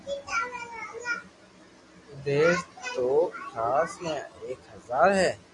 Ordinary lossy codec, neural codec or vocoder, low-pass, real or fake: MP3, 48 kbps; none; 10.8 kHz; real